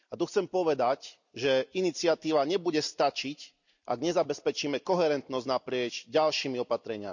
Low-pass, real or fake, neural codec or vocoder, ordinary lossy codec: 7.2 kHz; real; none; none